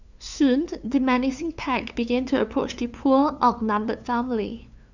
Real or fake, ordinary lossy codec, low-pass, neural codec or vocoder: fake; none; 7.2 kHz; codec, 16 kHz, 2 kbps, FunCodec, trained on LibriTTS, 25 frames a second